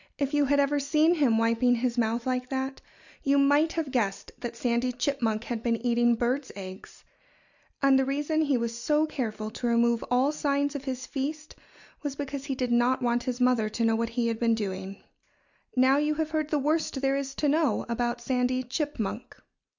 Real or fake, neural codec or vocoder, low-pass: real; none; 7.2 kHz